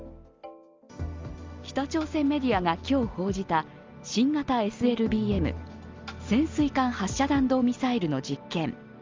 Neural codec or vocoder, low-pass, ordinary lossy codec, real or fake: none; 7.2 kHz; Opus, 32 kbps; real